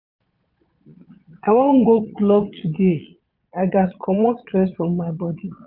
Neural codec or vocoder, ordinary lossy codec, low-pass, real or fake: vocoder, 22.05 kHz, 80 mel bands, Vocos; none; 5.4 kHz; fake